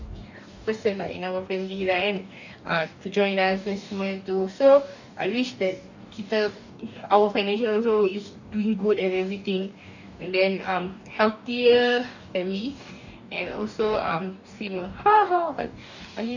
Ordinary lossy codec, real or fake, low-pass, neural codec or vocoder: none; fake; 7.2 kHz; codec, 44.1 kHz, 2.6 kbps, DAC